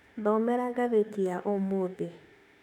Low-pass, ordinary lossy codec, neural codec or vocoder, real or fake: 19.8 kHz; none; autoencoder, 48 kHz, 32 numbers a frame, DAC-VAE, trained on Japanese speech; fake